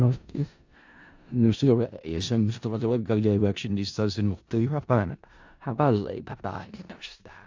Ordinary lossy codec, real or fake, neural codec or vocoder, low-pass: AAC, 48 kbps; fake; codec, 16 kHz in and 24 kHz out, 0.4 kbps, LongCat-Audio-Codec, four codebook decoder; 7.2 kHz